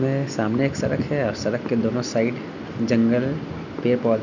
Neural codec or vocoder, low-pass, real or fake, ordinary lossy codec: none; 7.2 kHz; real; none